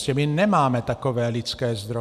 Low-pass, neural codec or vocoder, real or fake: 14.4 kHz; none; real